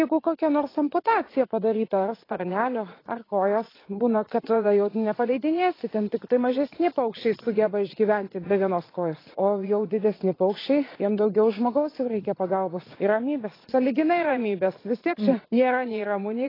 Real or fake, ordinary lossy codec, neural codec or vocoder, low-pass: fake; AAC, 24 kbps; vocoder, 22.05 kHz, 80 mel bands, Vocos; 5.4 kHz